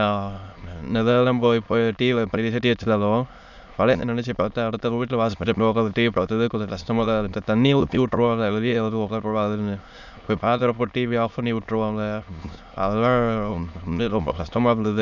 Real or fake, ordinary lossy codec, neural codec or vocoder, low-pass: fake; none; autoencoder, 22.05 kHz, a latent of 192 numbers a frame, VITS, trained on many speakers; 7.2 kHz